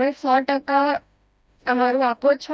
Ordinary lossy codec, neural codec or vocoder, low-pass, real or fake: none; codec, 16 kHz, 1 kbps, FreqCodec, smaller model; none; fake